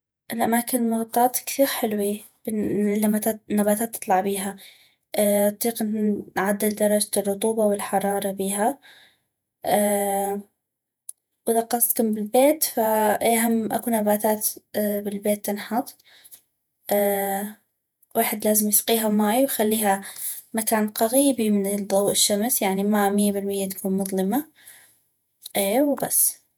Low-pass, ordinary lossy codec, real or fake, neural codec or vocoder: none; none; fake; vocoder, 48 kHz, 128 mel bands, Vocos